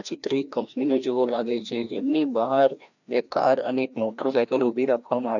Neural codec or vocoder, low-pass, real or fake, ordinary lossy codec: codec, 16 kHz, 1 kbps, FreqCodec, larger model; 7.2 kHz; fake; none